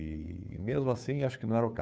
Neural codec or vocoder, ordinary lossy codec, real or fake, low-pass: codec, 16 kHz, 2 kbps, FunCodec, trained on Chinese and English, 25 frames a second; none; fake; none